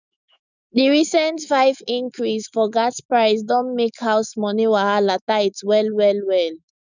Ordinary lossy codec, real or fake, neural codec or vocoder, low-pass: none; fake; autoencoder, 48 kHz, 128 numbers a frame, DAC-VAE, trained on Japanese speech; 7.2 kHz